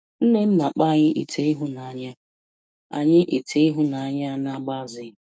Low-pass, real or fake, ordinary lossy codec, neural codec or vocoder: none; fake; none; codec, 16 kHz, 6 kbps, DAC